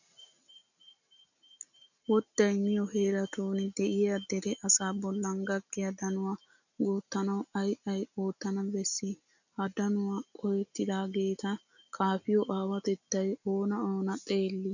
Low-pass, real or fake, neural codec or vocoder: 7.2 kHz; real; none